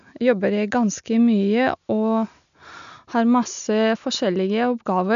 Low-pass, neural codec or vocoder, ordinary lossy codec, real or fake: 7.2 kHz; none; none; real